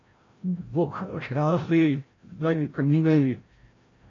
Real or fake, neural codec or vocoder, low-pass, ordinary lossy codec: fake; codec, 16 kHz, 0.5 kbps, FreqCodec, larger model; 7.2 kHz; AAC, 32 kbps